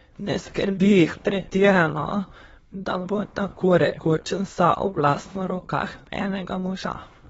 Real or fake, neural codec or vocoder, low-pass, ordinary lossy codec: fake; autoencoder, 22.05 kHz, a latent of 192 numbers a frame, VITS, trained on many speakers; 9.9 kHz; AAC, 24 kbps